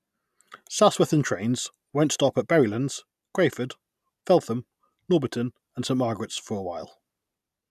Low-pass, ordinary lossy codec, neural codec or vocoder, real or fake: 14.4 kHz; none; none; real